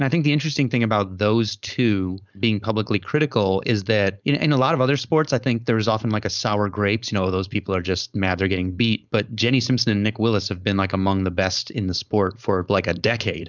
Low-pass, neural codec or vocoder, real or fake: 7.2 kHz; codec, 16 kHz, 4.8 kbps, FACodec; fake